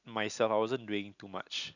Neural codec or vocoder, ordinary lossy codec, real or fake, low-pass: none; none; real; 7.2 kHz